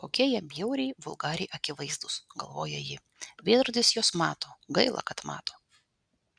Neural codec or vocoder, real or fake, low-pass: none; real; 9.9 kHz